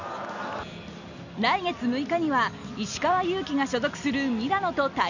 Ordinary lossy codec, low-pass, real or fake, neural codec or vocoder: none; 7.2 kHz; real; none